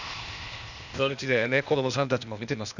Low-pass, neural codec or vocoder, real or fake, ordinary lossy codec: 7.2 kHz; codec, 16 kHz, 0.8 kbps, ZipCodec; fake; none